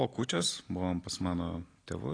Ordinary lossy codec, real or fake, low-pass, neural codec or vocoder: AAC, 32 kbps; real; 9.9 kHz; none